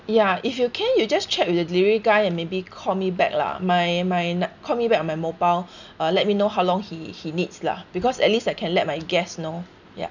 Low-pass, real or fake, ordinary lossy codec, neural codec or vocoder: 7.2 kHz; real; none; none